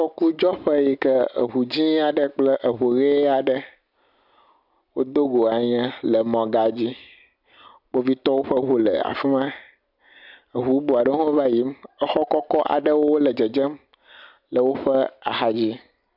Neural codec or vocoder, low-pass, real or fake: none; 5.4 kHz; real